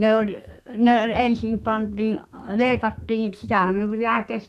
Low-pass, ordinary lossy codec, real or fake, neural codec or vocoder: 14.4 kHz; none; fake; codec, 32 kHz, 1.9 kbps, SNAC